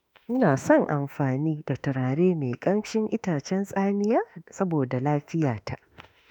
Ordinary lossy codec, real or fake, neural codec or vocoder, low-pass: none; fake; autoencoder, 48 kHz, 32 numbers a frame, DAC-VAE, trained on Japanese speech; 19.8 kHz